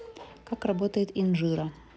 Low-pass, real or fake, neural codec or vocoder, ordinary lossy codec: none; real; none; none